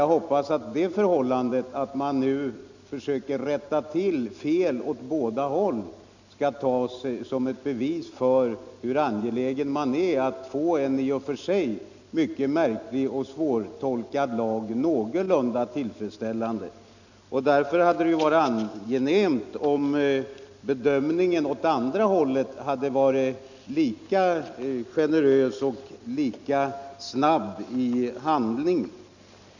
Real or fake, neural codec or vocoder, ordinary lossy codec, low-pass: real; none; none; 7.2 kHz